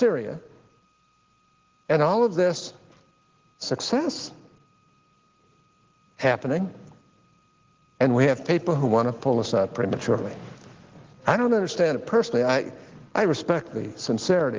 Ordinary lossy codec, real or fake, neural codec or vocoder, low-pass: Opus, 16 kbps; real; none; 7.2 kHz